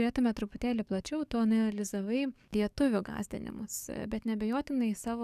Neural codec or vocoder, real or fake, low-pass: codec, 44.1 kHz, 7.8 kbps, DAC; fake; 14.4 kHz